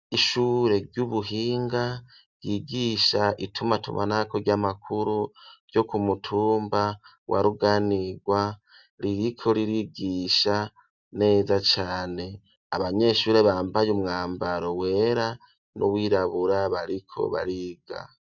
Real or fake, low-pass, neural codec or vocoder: real; 7.2 kHz; none